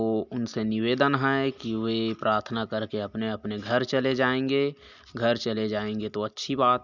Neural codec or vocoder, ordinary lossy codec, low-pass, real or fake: none; none; 7.2 kHz; real